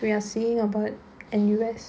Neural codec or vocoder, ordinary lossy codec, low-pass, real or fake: none; none; none; real